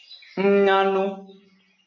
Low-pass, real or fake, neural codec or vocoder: 7.2 kHz; real; none